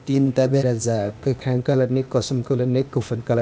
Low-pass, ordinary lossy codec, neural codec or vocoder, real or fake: none; none; codec, 16 kHz, 0.8 kbps, ZipCodec; fake